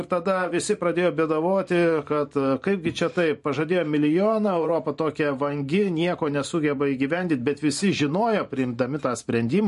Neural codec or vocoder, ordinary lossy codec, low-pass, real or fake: vocoder, 44.1 kHz, 128 mel bands every 512 samples, BigVGAN v2; MP3, 48 kbps; 14.4 kHz; fake